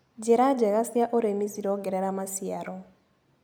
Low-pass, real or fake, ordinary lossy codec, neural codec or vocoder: none; real; none; none